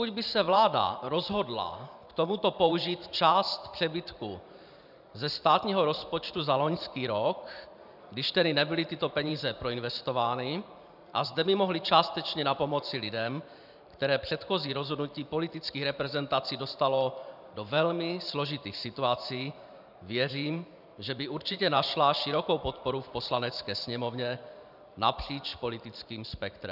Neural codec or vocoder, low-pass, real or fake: vocoder, 44.1 kHz, 128 mel bands every 512 samples, BigVGAN v2; 5.4 kHz; fake